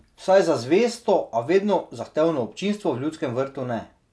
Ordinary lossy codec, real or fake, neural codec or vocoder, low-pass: none; real; none; none